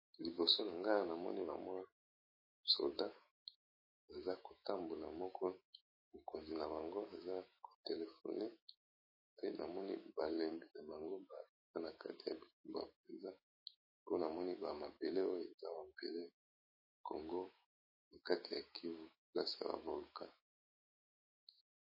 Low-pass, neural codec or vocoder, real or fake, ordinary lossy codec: 5.4 kHz; autoencoder, 48 kHz, 128 numbers a frame, DAC-VAE, trained on Japanese speech; fake; MP3, 24 kbps